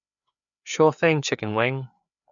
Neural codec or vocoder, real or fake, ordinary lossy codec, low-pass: codec, 16 kHz, 4 kbps, FreqCodec, larger model; fake; none; 7.2 kHz